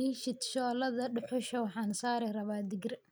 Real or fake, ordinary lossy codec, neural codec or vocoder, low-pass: real; none; none; none